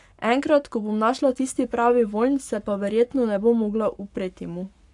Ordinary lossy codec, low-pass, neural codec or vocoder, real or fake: AAC, 64 kbps; 10.8 kHz; codec, 44.1 kHz, 7.8 kbps, Pupu-Codec; fake